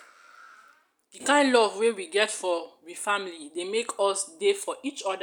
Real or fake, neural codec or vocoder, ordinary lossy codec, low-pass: real; none; none; 19.8 kHz